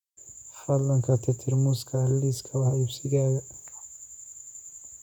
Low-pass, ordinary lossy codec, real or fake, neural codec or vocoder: 19.8 kHz; none; fake; vocoder, 48 kHz, 128 mel bands, Vocos